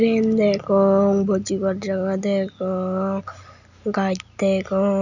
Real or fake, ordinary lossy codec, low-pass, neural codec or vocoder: real; none; 7.2 kHz; none